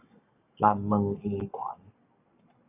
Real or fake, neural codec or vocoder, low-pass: fake; codec, 44.1 kHz, 7.8 kbps, Pupu-Codec; 3.6 kHz